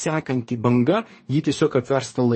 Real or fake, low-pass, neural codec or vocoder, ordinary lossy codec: fake; 10.8 kHz; codec, 44.1 kHz, 2.6 kbps, DAC; MP3, 32 kbps